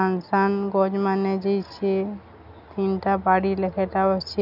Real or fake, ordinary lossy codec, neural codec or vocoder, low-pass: real; none; none; 5.4 kHz